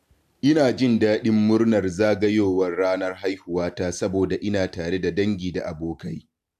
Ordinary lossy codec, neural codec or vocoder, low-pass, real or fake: AAC, 96 kbps; none; 14.4 kHz; real